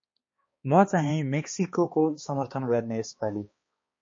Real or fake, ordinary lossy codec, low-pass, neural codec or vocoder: fake; MP3, 32 kbps; 7.2 kHz; codec, 16 kHz, 2 kbps, X-Codec, HuBERT features, trained on balanced general audio